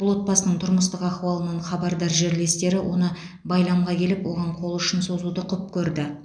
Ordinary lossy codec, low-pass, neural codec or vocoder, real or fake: none; 9.9 kHz; none; real